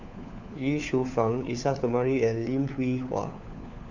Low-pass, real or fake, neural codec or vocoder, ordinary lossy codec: 7.2 kHz; fake; codec, 16 kHz, 4 kbps, FunCodec, trained on LibriTTS, 50 frames a second; none